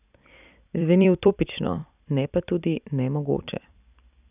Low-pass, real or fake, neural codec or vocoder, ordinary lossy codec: 3.6 kHz; fake; vocoder, 44.1 kHz, 128 mel bands every 256 samples, BigVGAN v2; none